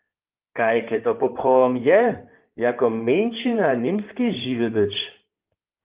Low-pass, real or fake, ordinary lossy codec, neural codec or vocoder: 3.6 kHz; fake; Opus, 32 kbps; codec, 16 kHz in and 24 kHz out, 2.2 kbps, FireRedTTS-2 codec